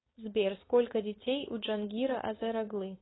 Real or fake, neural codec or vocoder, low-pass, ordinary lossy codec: fake; codec, 16 kHz, 4.8 kbps, FACodec; 7.2 kHz; AAC, 16 kbps